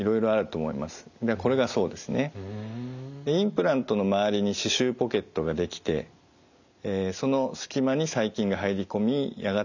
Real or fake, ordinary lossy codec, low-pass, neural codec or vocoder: real; none; 7.2 kHz; none